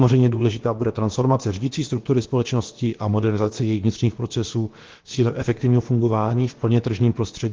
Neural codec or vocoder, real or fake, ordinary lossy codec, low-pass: codec, 16 kHz, about 1 kbps, DyCAST, with the encoder's durations; fake; Opus, 16 kbps; 7.2 kHz